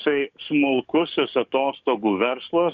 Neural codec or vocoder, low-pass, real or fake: codec, 44.1 kHz, 7.8 kbps, DAC; 7.2 kHz; fake